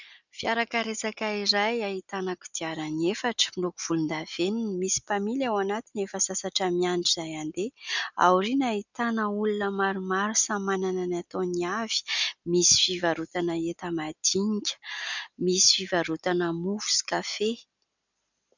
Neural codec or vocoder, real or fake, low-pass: none; real; 7.2 kHz